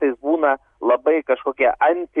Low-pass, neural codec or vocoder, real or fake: 10.8 kHz; none; real